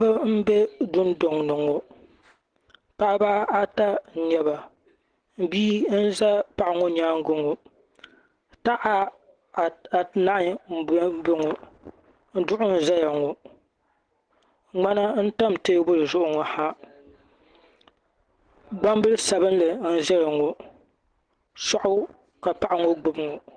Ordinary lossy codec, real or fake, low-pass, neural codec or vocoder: Opus, 16 kbps; real; 9.9 kHz; none